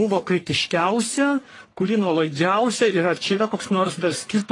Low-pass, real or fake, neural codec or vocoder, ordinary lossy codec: 10.8 kHz; fake; codec, 44.1 kHz, 1.7 kbps, Pupu-Codec; AAC, 32 kbps